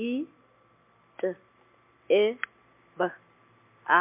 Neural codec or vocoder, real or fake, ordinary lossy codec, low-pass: none; real; MP3, 32 kbps; 3.6 kHz